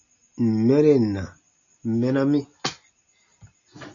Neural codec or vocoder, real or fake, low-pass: none; real; 7.2 kHz